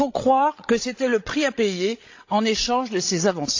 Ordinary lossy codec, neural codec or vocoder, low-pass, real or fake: AAC, 48 kbps; codec, 16 kHz, 16 kbps, FreqCodec, larger model; 7.2 kHz; fake